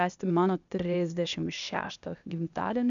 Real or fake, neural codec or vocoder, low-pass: fake; codec, 16 kHz, 0.8 kbps, ZipCodec; 7.2 kHz